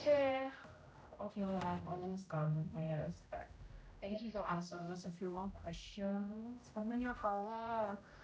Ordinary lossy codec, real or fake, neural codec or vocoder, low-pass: none; fake; codec, 16 kHz, 0.5 kbps, X-Codec, HuBERT features, trained on general audio; none